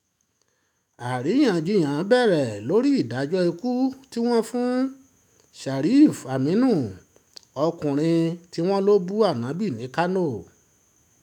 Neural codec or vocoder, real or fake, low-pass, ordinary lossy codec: autoencoder, 48 kHz, 128 numbers a frame, DAC-VAE, trained on Japanese speech; fake; 19.8 kHz; none